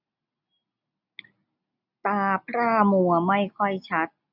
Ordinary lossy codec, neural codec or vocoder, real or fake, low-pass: none; none; real; 5.4 kHz